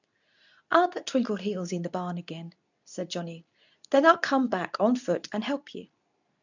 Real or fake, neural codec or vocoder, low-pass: fake; codec, 24 kHz, 0.9 kbps, WavTokenizer, medium speech release version 2; 7.2 kHz